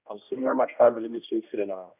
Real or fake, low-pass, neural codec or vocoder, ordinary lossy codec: fake; 3.6 kHz; codec, 16 kHz, 1 kbps, X-Codec, HuBERT features, trained on general audio; none